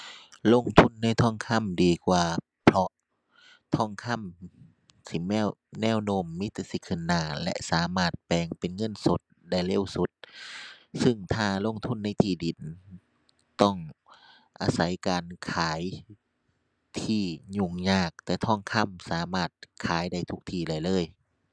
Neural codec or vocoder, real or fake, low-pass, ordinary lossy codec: none; real; none; none